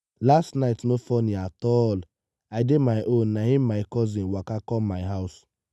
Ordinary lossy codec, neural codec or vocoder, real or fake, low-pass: none; none; real; none